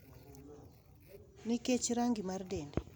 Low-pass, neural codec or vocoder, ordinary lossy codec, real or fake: none; none; none; real